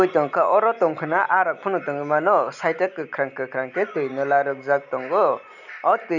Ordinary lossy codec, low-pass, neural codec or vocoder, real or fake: none; 7.2 kHz; none; real